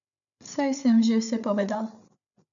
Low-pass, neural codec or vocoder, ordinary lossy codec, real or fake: 7.2 kHz; codec, 16 kHz, 8 kbps, FreqCodec, larger model; none; fake